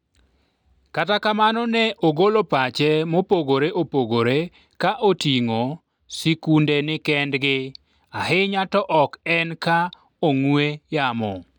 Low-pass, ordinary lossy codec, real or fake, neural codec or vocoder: 19.8 kHz; none; real; none